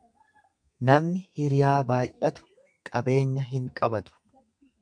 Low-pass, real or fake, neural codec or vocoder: 9.9 kHz; fake; codec, 32 kHz, 1.9 kbps, SNAC